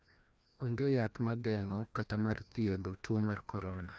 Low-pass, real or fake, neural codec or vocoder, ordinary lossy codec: none; fake; codec, 16 kHz, 1 kbps, FreqCodec, larger model; none